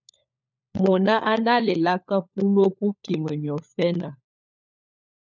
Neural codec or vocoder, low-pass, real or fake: codec, 16 kHz, 4 kbps, FunCodec, trained on LibriTTS, 50 frames a second; 7.2 kHz; fake